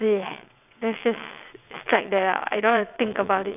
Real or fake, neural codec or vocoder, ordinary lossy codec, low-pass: fake; vocoder, 22.05 kHz, 80 mel bands, WaveNeXt; none; 3.6 kHz